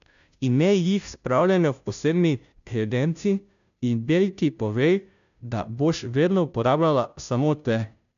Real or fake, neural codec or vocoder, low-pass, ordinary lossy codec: fake; codec, 16 kHz, 0.5 kbps, FunCodec, trained on Chinese and English, 25 frames a second; 7.2 kHz; none